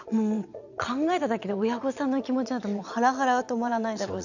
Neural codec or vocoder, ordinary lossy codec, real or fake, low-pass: vocoder, 22.05 kHz, 80 mel bands, Vocos; none; fake; 7.2 kHz